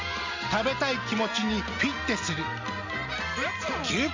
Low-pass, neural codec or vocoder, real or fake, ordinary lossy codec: 7.2 kHz; none; real; MP3, 64 kbps